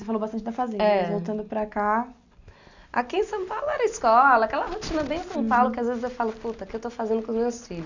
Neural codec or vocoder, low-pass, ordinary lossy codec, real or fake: none; 7.2 kHz; none; real